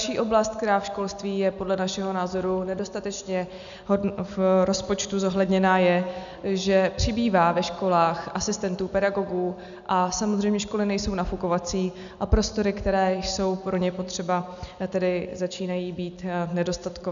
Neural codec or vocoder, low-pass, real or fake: none; 7.2 kHz; real